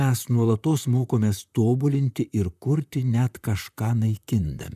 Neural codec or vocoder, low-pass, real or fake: vocoder, 44.1 kHz, 128 mel bands, Pupu-Vocoder; 14.4 kHz; fake